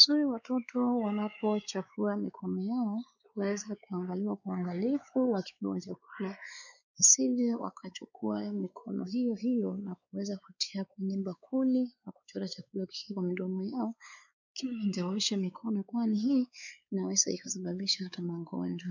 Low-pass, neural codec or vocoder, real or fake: 7.2 kHz; codec, 16 kHz, 4 kbps, X-Codec, WavLM features, trained on Multilingual LibriSpeech; fake